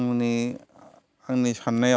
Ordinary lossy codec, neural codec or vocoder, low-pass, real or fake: none; none; none; real